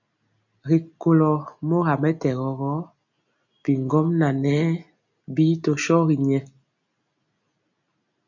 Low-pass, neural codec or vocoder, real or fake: 7.2 kHz; none; real